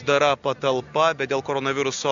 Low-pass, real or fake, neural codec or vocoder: 7.2 kHz; real; none